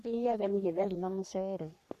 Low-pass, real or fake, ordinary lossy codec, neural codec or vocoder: 14.4 kHz; fake; none; codec, 44.1 kHz, 3.4 kbps, Pupu-Codec